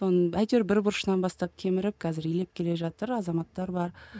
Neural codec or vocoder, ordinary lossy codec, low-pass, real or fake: none; none; none; real